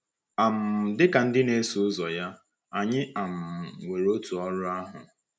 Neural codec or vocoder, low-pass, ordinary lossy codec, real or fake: none; none; none; real